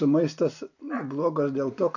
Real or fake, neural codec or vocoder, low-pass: real; none; 7.2 kHz